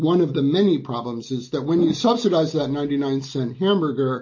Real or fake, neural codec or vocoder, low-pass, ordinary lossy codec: real; none; 7.2 kHz; MP3, 32 kbps